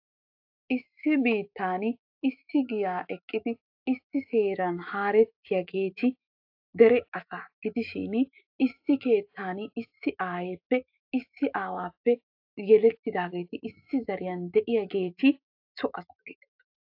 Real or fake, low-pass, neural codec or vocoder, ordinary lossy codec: fake; 5.4 kHz; autoencoder, 48 kHz, 128 numbers a frame, DAC-VAE, trained on Japanese speech; AAC, 48 kbps